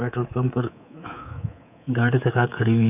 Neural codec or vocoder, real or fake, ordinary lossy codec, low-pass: codec, 24 kHz, 3.1 kbps, DualCodec; fake; none; 3.6 kHz